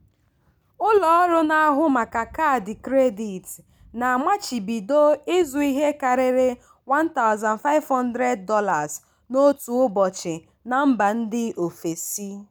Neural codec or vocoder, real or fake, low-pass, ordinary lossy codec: none; real; none; none